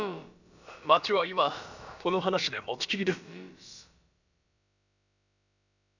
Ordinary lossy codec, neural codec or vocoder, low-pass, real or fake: none; codec, 16 kHz, about 1 kbps, DyCAST, with the encoder's durations; 7.2 kHz; fake